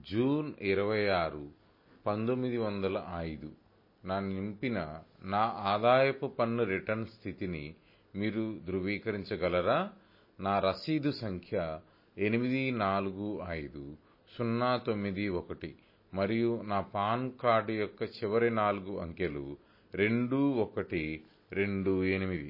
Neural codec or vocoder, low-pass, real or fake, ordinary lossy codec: none; 5.4 kHz; real; MP3, 24 kbps